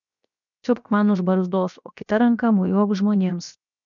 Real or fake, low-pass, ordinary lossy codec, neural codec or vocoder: fake; 7.2 kHz; MP3, 96 kbps; codec, 16 kHz, 0.7 kbps, FocalCodec